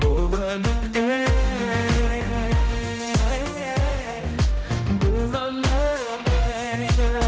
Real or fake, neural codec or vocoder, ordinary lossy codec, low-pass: fake; codec, 16 kHz, 0.5 kbps, X-Codec, HuBERT features, trained on general audio; none; none